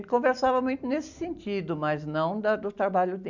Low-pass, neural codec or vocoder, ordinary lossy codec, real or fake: 7.2 kHz; none; none; real